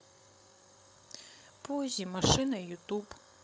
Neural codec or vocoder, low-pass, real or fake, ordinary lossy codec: codec, 16 kHz, 16 kbps, FreqCodec, larger model; none; fake; none